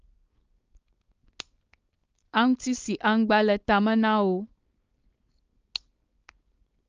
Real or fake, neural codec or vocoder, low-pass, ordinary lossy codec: fake; codec, 16 kHz, 4.8 kbps, FACodec; 7.2 kHz; Opus, 24 kbps